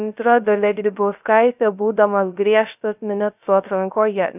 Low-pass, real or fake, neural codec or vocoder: 3.6 kHz; fake; codec, 16 kHz, 0.3 kbps, FocalCodec